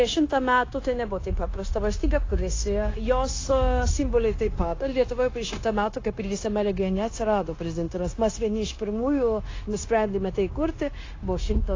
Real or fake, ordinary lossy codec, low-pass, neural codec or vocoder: fake; AAC, 32 kbps; 7.2 kHz; codec, 16 kHz, 0.9 kbps, LongCat-Audio-Codec